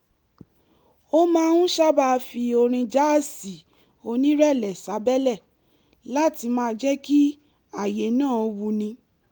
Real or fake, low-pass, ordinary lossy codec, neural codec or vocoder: real; 19.8 kHz; Opus, 32 kbps; none